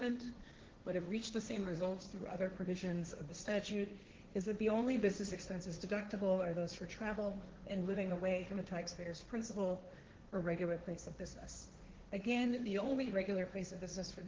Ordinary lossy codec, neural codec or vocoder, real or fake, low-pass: Opus, 16 kbps; codec, 16 kHz, 1.1 kbps, Voila-Tokenizer; fake; 7.2 kHz